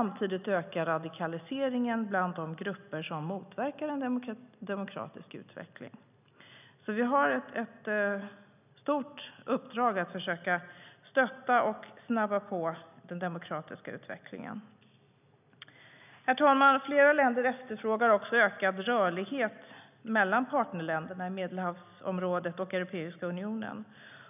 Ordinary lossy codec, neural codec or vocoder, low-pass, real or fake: none; none; 3.6 kHz; real